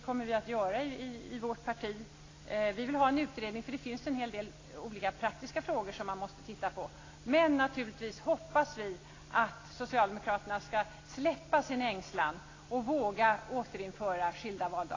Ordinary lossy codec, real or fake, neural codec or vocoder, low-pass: AAC, 32 kbps; real; none; 7.2 kHz